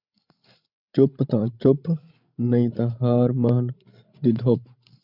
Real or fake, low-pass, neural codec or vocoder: fake; 5.4 kHz; codec, 16 kHz, 16 kbps, FreqCodec, larger model